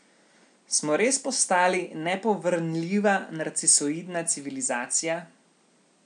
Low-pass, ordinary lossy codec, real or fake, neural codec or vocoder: 9.9 kHz; none; real; none